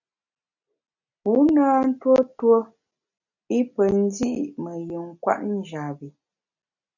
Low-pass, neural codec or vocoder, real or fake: 7.2 kHz; none; real